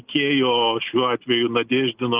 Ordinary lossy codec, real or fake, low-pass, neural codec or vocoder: Opus, 32 kbps; real; 3.6 kHz; none